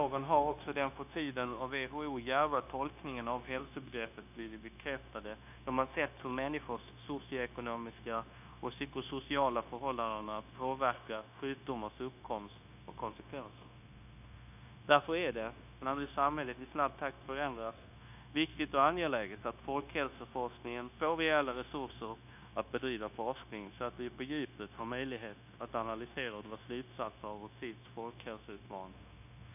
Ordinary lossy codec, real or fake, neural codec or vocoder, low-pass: none; fake; codec, 16 kHz, 0.9 kbps, LongCat-Audio-Codec; 3.6 kHz